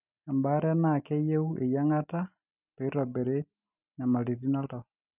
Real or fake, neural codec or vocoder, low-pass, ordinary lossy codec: real; none; 3.6 kHz; none